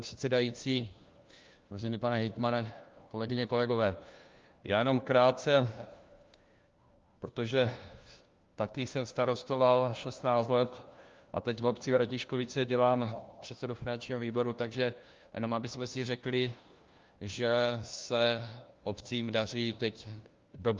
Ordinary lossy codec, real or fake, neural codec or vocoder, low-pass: Opus, 32 kbps; fake; codec, 16 kHz, 1 kbps, FunCodec, trained on LibriTTS, 50 frames a second; 7.2 kHz